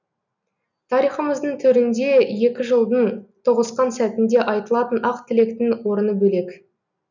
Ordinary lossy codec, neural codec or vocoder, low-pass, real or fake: none; none; 7.2 kHz; real